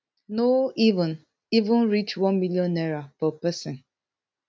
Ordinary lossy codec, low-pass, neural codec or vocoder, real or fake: none; none; none; real